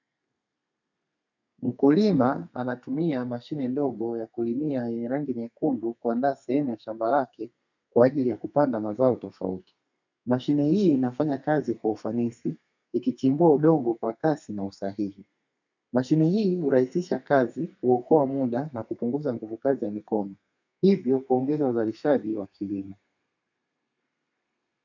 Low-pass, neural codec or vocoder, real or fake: 7.2 kHz; codec, 32 kHz, 1.9 kbps, SNAC; fake